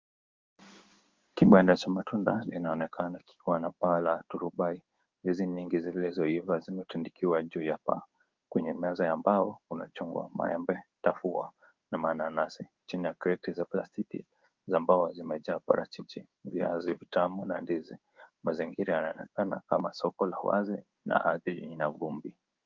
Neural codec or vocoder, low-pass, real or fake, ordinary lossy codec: codec, 16 kHz in and 24 kHz out, 1 kbps, XY-Tokenizer; 7.2 kHz; fake; Opus, 32 kbps